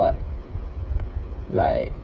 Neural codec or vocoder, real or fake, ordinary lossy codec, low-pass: codec, 16 kHz, 8 kbps, FreqCodec, smaller model; fake; none; none